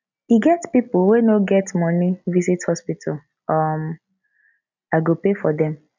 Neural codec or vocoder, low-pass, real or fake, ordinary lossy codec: none; 7.2 kHz; real; none